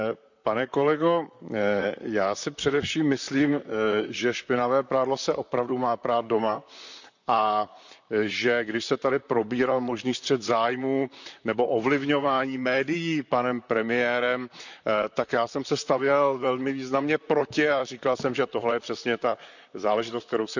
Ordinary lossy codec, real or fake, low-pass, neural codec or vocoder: none; fake; 7.2 kHz; vocoder, 44.1 kHz, 128 mel bands, Pupu-Vocoder